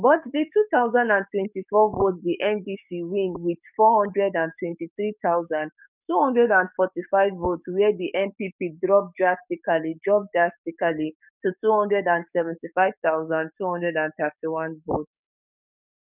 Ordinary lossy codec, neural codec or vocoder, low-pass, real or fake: none; codec, 44.1 kHz, 7.8 kbps, DAC; 3.6 kHz; fake